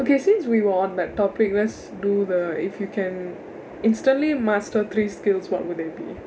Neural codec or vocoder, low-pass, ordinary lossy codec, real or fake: none; none; none; real